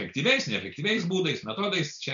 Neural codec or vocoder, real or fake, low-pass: none; real; 7.2 kHz